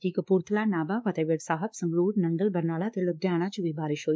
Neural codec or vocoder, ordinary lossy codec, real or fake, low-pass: codec, 16 kHz, 2 kbps, X-Codec, WavLM features, trained on Multilingual LibriSpeech; none; fake; none